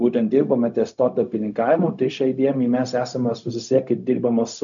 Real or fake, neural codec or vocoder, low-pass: fake; codec, 16 kHz, 0.4 kbps, LongCat-Audio-Codec; 7.2 kHz